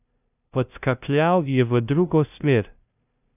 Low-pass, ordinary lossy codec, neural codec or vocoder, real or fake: 3.6 kHz; none; codec, 16 kHz, 0.5 kbps, FunCodec, trained on LibriTTS, 25 frames a second; fake